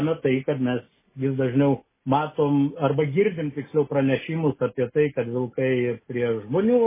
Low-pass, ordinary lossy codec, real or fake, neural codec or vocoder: 3.6 kHz; MP3, 16 kbps; real; none